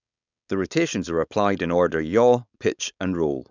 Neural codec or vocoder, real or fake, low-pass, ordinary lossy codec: codec, 16 kHz, 4.8 kbps, FACodec; fake; 7.2 kHz; none